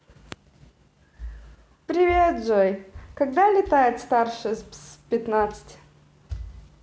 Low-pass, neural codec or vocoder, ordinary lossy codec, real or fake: none; none; none; real